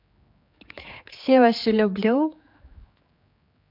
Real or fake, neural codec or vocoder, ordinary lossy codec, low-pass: fake; codec, 16 kHz, 4 kbps, X-Codec, HuBERT features, trained on general audio; MP3, 48 kbps; 5.4 kHz